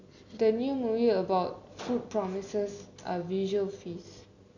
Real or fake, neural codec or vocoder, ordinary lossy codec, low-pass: real; none; none; 7.2 kHz